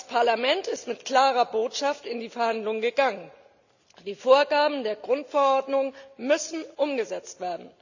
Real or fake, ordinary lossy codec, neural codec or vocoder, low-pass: real; none; none; 7.2 kHz